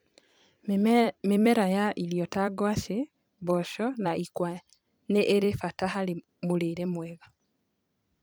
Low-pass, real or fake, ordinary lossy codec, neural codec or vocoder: none; fake; none; vocoder, 44.1 kHz, 128 mel bands every 256 samples, BigVGAN v2